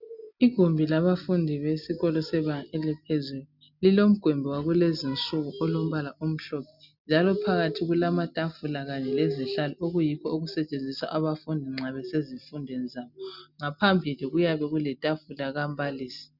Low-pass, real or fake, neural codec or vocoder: 5.4 kHz; real; none